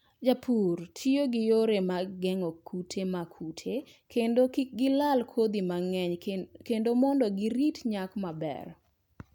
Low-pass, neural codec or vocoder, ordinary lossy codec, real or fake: 19.8 kHz; none; none; real